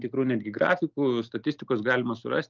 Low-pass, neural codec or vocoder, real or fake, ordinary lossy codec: 7.2 kHz; none; real; Opus, 32 kbps